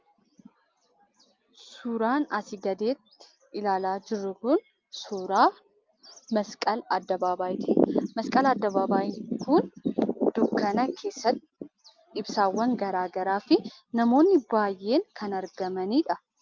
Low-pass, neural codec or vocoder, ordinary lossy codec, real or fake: 7.2 kHz; none; Opus, 24 kbps; real